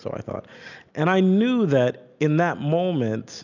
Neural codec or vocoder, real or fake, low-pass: none; real; 7.2 kHz